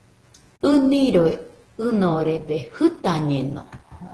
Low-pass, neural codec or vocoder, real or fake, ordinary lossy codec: 10.8 kHz; vocoder, 48 kHz, 128 mel bands, Vocos; fake; Opus, 16 kbps